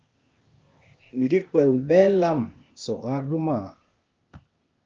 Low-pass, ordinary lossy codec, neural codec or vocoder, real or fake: 7.2 kHz; Opus, 24 kbps; codec, 16 kHz, 0.8 kbps, ZipCodec; fake